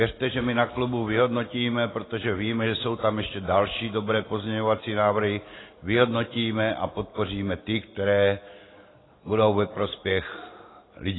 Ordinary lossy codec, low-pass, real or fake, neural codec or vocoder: AAC, 16 kbps; 7.2 kHz; real; none